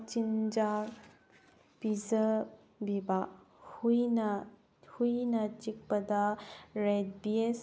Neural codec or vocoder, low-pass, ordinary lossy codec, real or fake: none; none; none; real